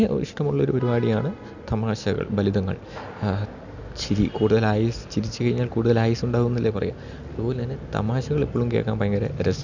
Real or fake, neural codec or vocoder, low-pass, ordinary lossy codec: real; none; 7.2 kHz; none